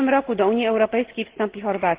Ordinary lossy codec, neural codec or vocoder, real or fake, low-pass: Opus, 16 kbps; none; real; 3.6 kHz